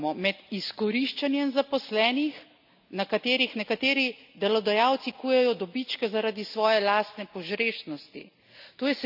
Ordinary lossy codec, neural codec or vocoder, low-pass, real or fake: none; none; 5.4 kHz; real